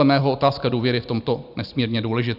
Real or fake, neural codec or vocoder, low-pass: real; none; 5.4 kHz